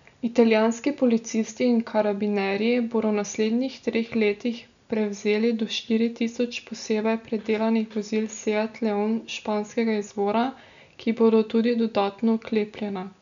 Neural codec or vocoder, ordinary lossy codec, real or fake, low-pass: none; none; real; 7.2 kHz